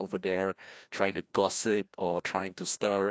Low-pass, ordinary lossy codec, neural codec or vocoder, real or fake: none; none; codec, 16 kHz, 1 kbps, FreqCodec, larger model; fake